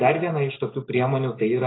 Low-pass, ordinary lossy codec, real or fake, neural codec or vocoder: 7.2 kHz; AAC, 16 kbps; real; none